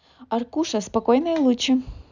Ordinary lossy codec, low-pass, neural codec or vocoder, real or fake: none; 7.2 kHz; none; real